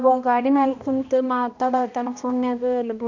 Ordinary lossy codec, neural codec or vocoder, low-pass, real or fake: none; codec, 16 kHz, 1 kbps, X-Codec, HuBERT features, trained on balanced general audio; 7.2 kHz; fake